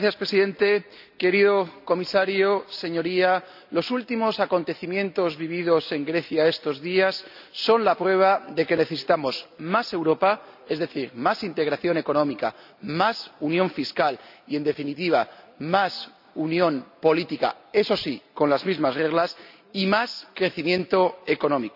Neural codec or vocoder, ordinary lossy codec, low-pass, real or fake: none; none; 5.4 kHz; real